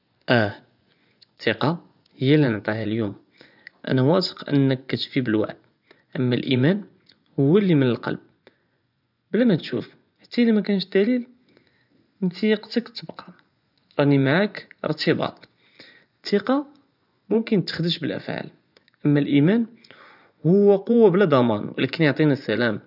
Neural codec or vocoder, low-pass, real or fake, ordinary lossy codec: vocoder, 24 kHz, 100 mel bands, Vocos; 5.4 kHz; fake; none